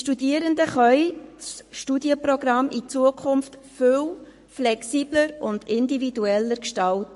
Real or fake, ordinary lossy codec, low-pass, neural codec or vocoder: fake; MP3, 48 kbps; 14.4 kHz; codec, 44.1 kHz, 7.8 kbps, Pupu-Codec